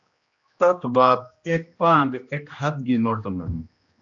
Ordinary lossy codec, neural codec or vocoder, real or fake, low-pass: AAC, 64 kbps; codec, 16 kHz, 1 kbps, X-Codec, HuBERT features, trained on general audio; fake; 7.2 kHz